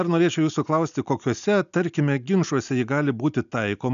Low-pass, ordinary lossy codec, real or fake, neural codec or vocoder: 7.2 kHz; MP3, 96 kbps; real; none